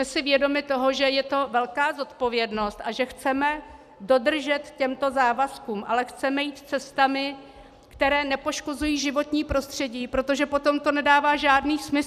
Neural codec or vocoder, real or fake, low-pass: none; real; 14.4 kHz